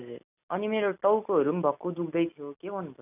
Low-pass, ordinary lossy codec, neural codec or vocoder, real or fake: 3.6 kHz; none; none; real